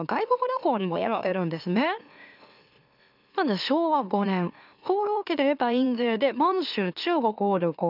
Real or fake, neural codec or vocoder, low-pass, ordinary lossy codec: fake; autoencoder, 44.1 kHz, a latent of 192 numbers a frame, MeloTTS; 5.4 kHz; none